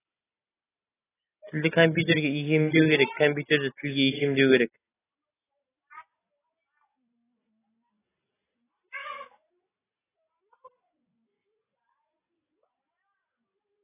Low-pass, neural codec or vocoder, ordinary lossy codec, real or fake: 3.6 kHz; none; AAC, 16 kbps; real